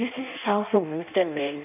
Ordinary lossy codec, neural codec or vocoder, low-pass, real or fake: none; codec, 16 kHz in and 24 kHz out, 0.6 kbps, FireRedTTS-2 codec; 3.6 kHz; fake